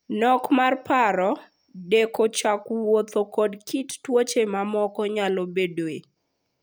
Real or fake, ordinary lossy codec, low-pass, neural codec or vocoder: real; none; none; none